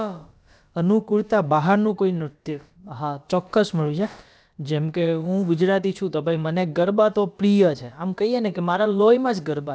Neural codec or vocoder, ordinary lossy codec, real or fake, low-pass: codec, 16 kHz, about 1 kbps, DyCAST, with the encoder's durations; none; fake; none